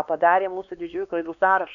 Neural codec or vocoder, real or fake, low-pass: codec, 16 kHz, 2 kbps, X-Codec, WavLM features, trained on Multilingual LibriSpeech; fake; 7.2 kHz